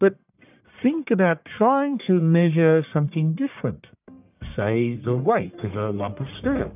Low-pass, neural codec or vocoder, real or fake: 3.6 kHz; codec, 44.1 kHz, 1.7 kbps, Pupu-Codec; fake